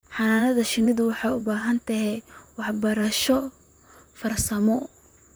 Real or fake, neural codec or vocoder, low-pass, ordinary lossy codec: fake; vocoder, 44.1 kHz, 128 mel bands, Pupu-Vocoder; none; none